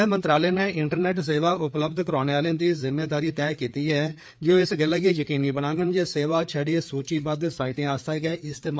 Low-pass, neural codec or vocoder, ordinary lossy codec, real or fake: none; codec, 16 kHz, 4 kbps, FreqCodec, larger model; none; fake